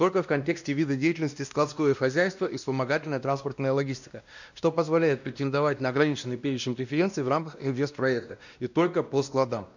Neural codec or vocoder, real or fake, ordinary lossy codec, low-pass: codec, 16 kHz, 1 kbps, X-Codec, WavLM features, trained on Multilingual LibriSpeech; fake; none; 7.2 kHz